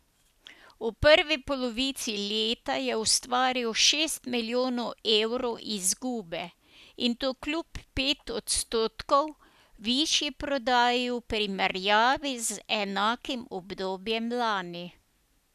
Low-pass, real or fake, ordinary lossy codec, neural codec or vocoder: 14.4 kHz; real; none; none